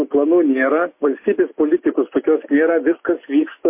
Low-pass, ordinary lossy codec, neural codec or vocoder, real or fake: 3.6 kHz; MP3, 24 kbps; none; real